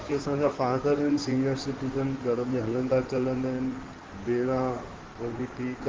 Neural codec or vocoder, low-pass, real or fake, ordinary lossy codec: codec, 16 kHz in and 24 kHz out, 2.2 kbps, FireRedTTS-2 codec; 7.2 kHz; fake; Opus, 16 kbps